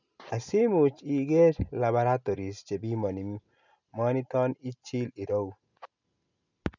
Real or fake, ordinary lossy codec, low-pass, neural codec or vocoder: real; none; 7.2 kHz; none